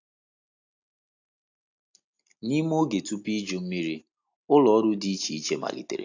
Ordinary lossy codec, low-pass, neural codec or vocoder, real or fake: AAC, 48 kbps; 7.2 kHz; none; real